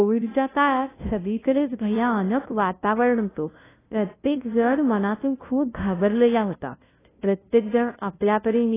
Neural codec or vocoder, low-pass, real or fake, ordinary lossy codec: codec, 16 kHz, 0.5 kbps, FunCodec, trained on LibriTTS, 25 frames a second; 3.6 kHz; fake; AAC, 16 kbps